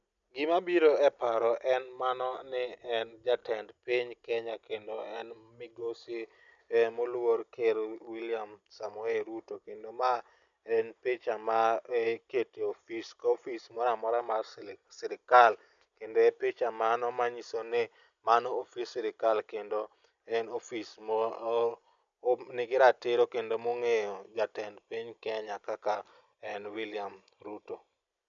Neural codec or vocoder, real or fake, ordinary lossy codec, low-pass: none; real; none; 7.2 kHz